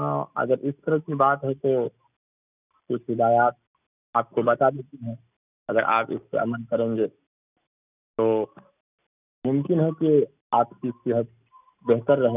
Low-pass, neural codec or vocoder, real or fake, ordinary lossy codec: 3.6 kHz; none; real; none